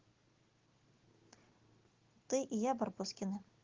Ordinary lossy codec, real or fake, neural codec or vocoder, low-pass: Opus, 16 kbps; real; none; 7.2 kHz